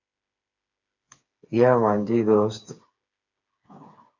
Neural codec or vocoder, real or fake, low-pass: codec, 16 kHz, 4 kbps, FreqCodec, smaller model; fake; 7.2 kHz